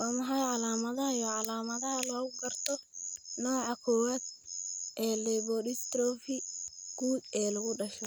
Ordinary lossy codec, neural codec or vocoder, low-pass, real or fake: none; none; none; real